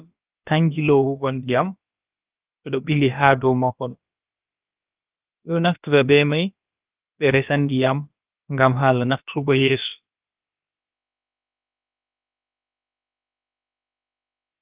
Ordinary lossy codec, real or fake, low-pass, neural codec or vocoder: Opus, 24 kbps; fake; 3.6 kHz; codec, 16 kHz, about 1 kbps, DyCAST, with the encoder's durations